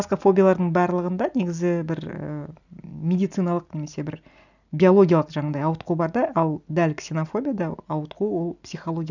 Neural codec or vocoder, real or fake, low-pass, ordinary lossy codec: none; real; 7.2 kHz; none